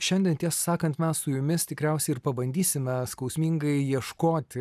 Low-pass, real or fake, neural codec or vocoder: 14.4 kHz; real; none